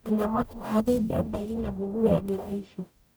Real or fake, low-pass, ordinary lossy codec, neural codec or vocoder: fake; none; none; codec, 44.1 kHz, 0.9 kbps, DAC